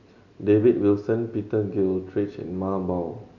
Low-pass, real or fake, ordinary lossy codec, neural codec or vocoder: 7.2 kHz; real; none; none